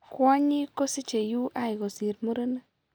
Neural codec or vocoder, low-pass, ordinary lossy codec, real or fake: none; none; none; real